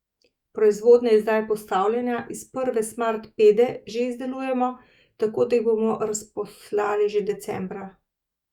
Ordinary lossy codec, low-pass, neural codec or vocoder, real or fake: Opus, 64 kbps; 19.8 kHz; autoencoder, 48 kHz, 128 numbers a frame, DAC-VAE, trained on Japanese speech; fake